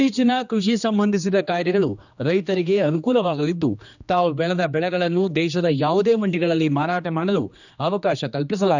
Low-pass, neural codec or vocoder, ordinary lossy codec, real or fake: 7.2 kHz; codec, 16 kHz, 2 kbps, X-Codec, HuBERT features, trained on general audio; none; fake